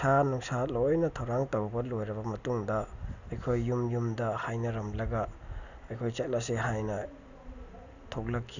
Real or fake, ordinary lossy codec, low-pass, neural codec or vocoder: real; none; 7.2 kHz; none